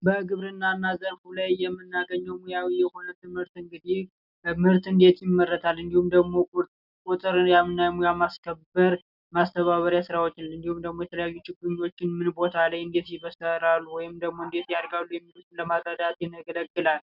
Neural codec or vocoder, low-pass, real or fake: none; 5.4 kHz; real